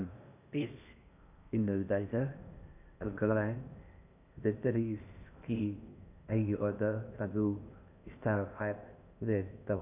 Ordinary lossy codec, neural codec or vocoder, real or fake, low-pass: none; codec, 16 kHz in and 24 kHz out, 0.6 kbps, FocalCodec, streaming, 4096 codes; fake; 3.6 kHz